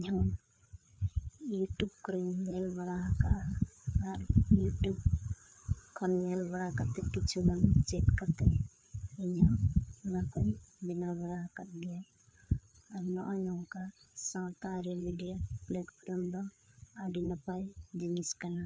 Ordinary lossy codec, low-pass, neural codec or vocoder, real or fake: none; none; codec, 16 kHz, 4 kbps, FreqCodec, larger model; fake